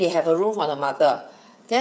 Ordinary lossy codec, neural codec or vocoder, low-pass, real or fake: none; codec, 16 kHz, 8 kbps, FreqCodec, smaller model; none; fake